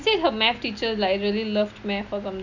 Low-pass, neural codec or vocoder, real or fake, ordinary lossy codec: 7.2 kHz; none; real; none